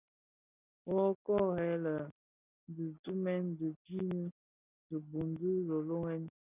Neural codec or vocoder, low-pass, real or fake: none; 3.6 kHz; real